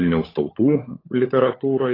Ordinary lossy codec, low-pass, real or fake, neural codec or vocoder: AAC, 24 kbps; 5.4 kHz; fake; codec, 16 kHz, 16 kbps, FunCodec, trained on LibriTTS, 50 frames a second